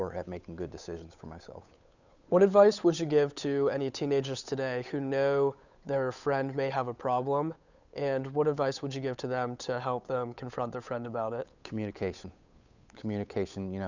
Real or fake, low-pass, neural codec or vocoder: fake; 7.2 kHz; codec, 16 kHz, 8 kbps, FunCodec, trained on Chinese and English, 25 frames a second